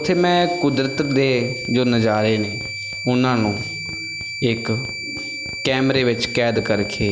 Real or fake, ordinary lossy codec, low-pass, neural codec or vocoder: real; none; none; none